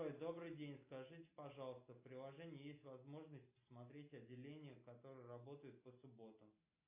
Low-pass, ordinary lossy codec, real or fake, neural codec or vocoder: 3.6 kHz; AAC, 32 kbps; real; none